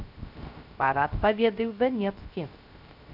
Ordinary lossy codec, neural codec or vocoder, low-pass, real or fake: none; codec, 16 kHz, 0.3 kbps, FocalCodec; 5.4 kHz; fake